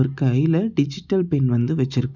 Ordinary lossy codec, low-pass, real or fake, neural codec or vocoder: none; 7.2 kHz; real; none